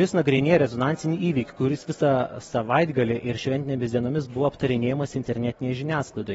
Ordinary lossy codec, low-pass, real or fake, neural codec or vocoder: AAC, 24 kbps; 10.8 kHz; real; none